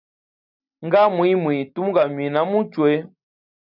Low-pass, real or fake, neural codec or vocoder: 5.4 kHz; real; none